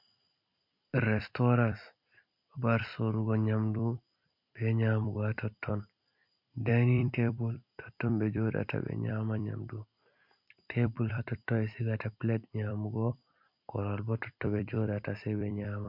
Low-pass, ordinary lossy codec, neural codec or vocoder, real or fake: 5.4 kHz; MP3, 48 kbps; vocoder, 44.1 kHz, 128 mel bands every 256 samples, BigVGAN v2; fake